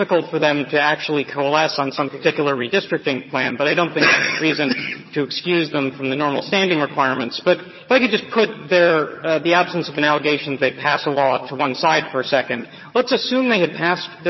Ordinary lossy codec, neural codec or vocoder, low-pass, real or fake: MP3, 24 kbps; vocoder, 22.05 kHz, 80 mel bands, HiFi-GAN; 7.2 kHz; fake